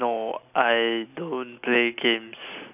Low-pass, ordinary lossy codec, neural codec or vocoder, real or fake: 3.6 kHz; none; none; real